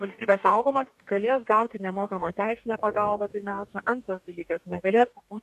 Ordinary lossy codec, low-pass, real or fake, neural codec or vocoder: MP3, 96 kbps; 14.4 kHz; fake; codec, 44.1 kHz, 2.6 kbps, DAC